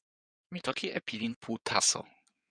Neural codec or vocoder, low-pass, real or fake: codec, 16 kHz in and 24 kHz out, 2.2 kbps, FireRedTTS-2 codec; 9.9 kHz; fake